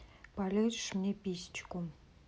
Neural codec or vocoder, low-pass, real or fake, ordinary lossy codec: none; none; real; none